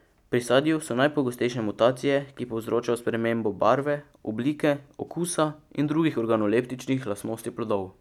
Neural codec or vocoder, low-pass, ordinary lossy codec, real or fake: none; 19.8 kHz; none; real